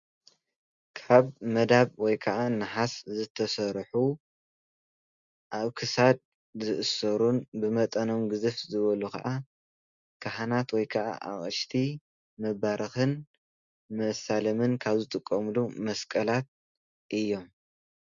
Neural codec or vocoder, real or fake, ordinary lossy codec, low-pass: none; real; Opus, 64 kbps; 7.2 kHz